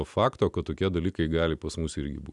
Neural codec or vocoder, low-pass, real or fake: none; 10.8 kHz; real